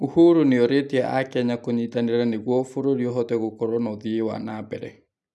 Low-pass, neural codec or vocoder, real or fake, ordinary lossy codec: none; none; real; none